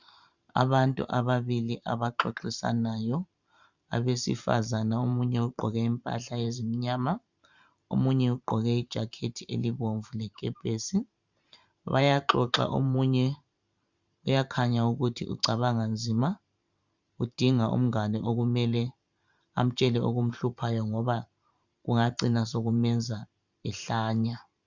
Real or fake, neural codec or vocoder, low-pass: fake; vocoder, 44.1 kHz, 128 mel bands every 512 samples, BigVGAN v2; 7.2 kHz